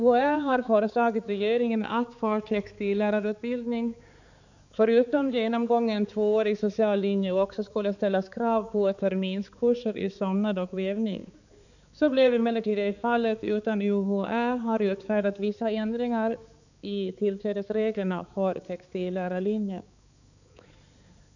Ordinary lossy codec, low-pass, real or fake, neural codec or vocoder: none; 7.2 kHz; fake; codec, 16 kHz, 4 kbps, X-Codec, HuBERT features, trained on balanced general audio